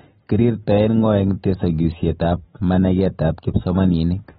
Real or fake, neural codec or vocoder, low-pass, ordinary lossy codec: real; none; 19.8 kHz; AAC, 16 kbps